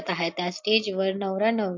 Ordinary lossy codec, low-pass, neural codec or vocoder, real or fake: MP3, 48 kbps; 7.2 kHz; none; real